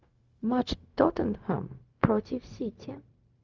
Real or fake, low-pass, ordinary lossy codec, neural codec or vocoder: fake; 7.2 kHz; Opus, 32 kbps; codec, 16 kHz, 0.4 kbps, LongCat-Audio-Codec